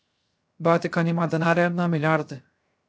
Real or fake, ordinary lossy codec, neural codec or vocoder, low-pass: fake; none; codec, 16 kHz, 0.3 kbps, FocalCodec; none